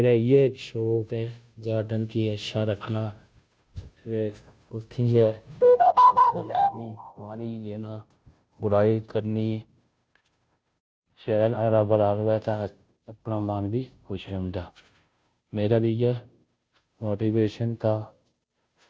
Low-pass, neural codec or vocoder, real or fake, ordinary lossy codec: none; codec, 16 kHz, 0.5 kbps, FunCodec, trained on Chinese and English, 25 frames a second; fake; none